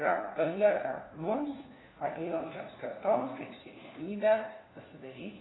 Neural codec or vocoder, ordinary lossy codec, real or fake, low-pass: codec, 16 kHz, 1 kbps, FunCodec, trained on LibriTTS, 50 frames a second; AAC, 16 kbps; fake; 7.2 kHz